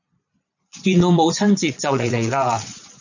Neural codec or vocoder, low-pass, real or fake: vocoder, 22.05 kHz, 80 mel bands, Vocos; 7.2 kHz; fake